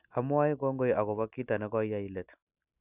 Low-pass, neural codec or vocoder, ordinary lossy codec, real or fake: 3.6 kHz; none; none; real